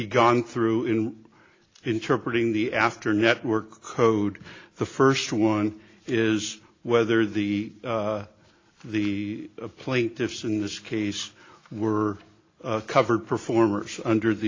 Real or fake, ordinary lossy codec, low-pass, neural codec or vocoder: real; AAC, 32 kbps; 7.2 kHz; none